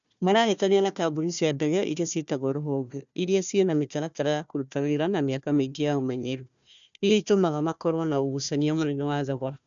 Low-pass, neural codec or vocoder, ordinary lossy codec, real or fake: 7.2 kHz; codec, 16 kHz, 1 kbps, FunCodec, trained on Chinese and English, 50 frames a second; none; fake